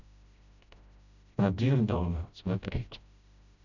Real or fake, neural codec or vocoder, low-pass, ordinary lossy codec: fake; codec, 16 kHz, 0.5 kbps, FreqCodec, smaller model; 7.2 kHz; none